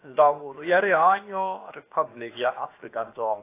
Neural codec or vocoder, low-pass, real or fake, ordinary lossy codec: codec, 16 kHz, about 1 kbps, DyCAST, with the encoder's durations; 3.6 kHz; fake; AAC, 24 kbps